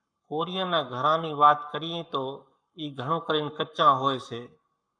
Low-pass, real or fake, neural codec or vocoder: 9.9 kHz; fake; codec, 44.1 kHz, 7.8 kbps, Pupu-Codec